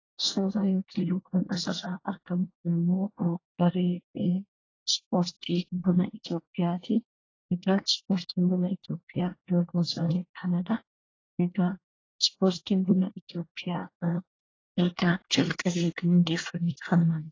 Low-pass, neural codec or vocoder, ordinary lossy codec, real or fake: 7.2 kHz; codec, 24 kHz, 1 kbps, SNAC; AAC, 32 kbps; fake